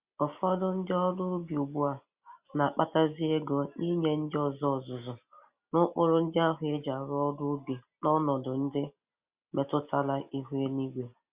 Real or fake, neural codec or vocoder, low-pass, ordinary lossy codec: real; none; 3.6 kHz; Opus, 64 kbps